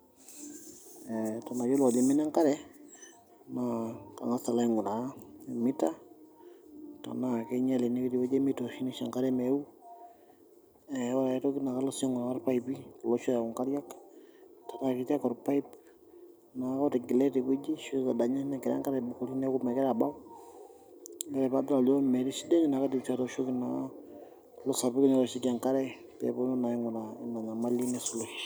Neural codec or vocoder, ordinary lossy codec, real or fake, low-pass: none; none; real; none